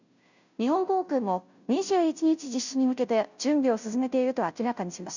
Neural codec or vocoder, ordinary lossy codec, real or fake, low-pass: codec, 16 kHz, 0.5 kbps, FunCodec, trained on Chinese and English, 25 frames a second; none; fake; 7.2 kHz